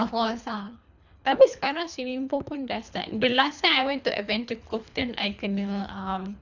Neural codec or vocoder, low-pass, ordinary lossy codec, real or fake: codec, 24 kHz, 3 kbps, HILCodec; 7.2 kHz; none; fake